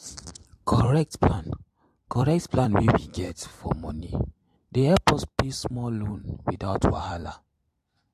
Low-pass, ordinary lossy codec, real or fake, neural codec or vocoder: 14.4 kHz; MP3, 64 kbps; fake; vocoder, 44.1 kHz, 128 mel bands every 256 samples, BigVGAN v2